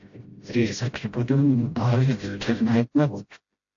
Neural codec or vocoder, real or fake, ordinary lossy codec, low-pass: codec, 16 kHz, 0.5 kbps, FreqCodec, smaller model; fake; AAC, 48 kbps; 7.2 kHz